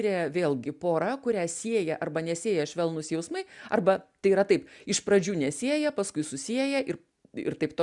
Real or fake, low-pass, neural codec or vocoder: real; 10.8 kHz; none